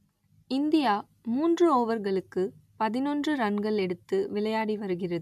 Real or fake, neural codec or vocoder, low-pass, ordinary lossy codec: real; none; 14.4 kHz; none